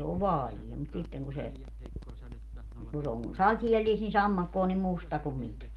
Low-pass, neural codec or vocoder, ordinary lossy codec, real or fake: 19.8 kHz; none; Opus, 24 kbps; real